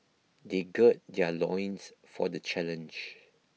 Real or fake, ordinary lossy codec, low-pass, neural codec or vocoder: real; none; none; none